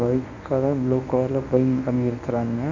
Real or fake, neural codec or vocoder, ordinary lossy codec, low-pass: fake; codec, 24 kHz, 0.9 kbps, WavTokenizer, medium speech release version 1; none; 7.2 kHz